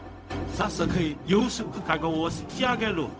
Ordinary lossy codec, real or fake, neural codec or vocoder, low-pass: none; fake; codec, 16 kHz, 0.4 kbps, LongCat-Audio-Codec; none